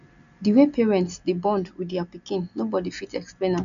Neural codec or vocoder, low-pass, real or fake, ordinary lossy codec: none; 7.2 kHz; real; none